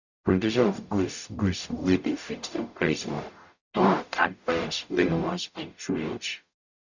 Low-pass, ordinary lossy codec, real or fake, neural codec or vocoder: 7.2 kHz; none; fake; codec, 44.1 kHz, 0.9 kbps, DAC